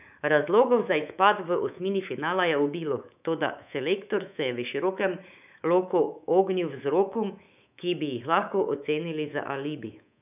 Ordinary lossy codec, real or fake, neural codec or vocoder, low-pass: none; fake; codec, 24 kHz, 3.1 kbps, DualCodec; 3.6 kHz